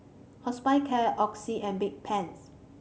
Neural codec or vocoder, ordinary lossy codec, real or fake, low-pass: none; none; real; none